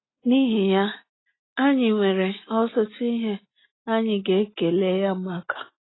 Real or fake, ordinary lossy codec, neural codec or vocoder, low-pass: real; AAC, 16 kbps; none; 7.2 kHz